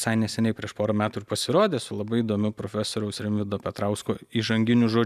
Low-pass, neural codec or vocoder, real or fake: 14.4 kHz; none; real